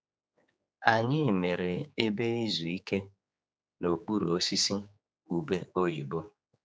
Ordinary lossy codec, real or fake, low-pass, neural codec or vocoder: none; fake; none; codec, 16 kHz, 4 kbps, X-Codec, HuBERT features, trained on general audio